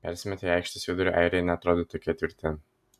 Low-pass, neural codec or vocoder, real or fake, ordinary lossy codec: 14.4 kHz; none; real; MP3, 96 kbps